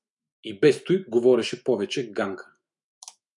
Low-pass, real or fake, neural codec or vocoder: 10.8 kHz; fake; autoencoder, 48 kHz, 128 numbers a frame, DAC-VAE, trained on Japanese speech